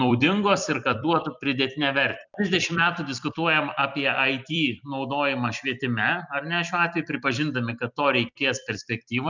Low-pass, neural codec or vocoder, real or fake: 7.2 kHz; vocoder, 44.1 kHz, 128 mel bands every 256 samples, BigVGAN v2; fake